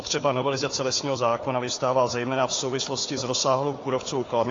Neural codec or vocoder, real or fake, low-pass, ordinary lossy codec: codec, 16 kHz, 4 kbps, FunCodec, trained on Chinese and English, 50 frames a second; fake; 7.2 kHz; AAC, 32 kbps